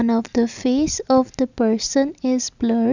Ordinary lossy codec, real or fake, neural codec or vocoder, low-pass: none; real; none; 7.2 kHz